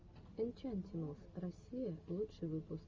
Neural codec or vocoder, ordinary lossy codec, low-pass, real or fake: none; Opus, 32 kbps; 7.2 kHz; real